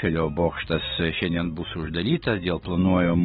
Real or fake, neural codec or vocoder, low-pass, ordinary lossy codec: real; none; 19.8 kHz; AAC, 16 kbps